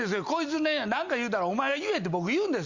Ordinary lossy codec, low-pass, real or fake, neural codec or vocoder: Opus, 64 kbps; 7.2 kHz; real; none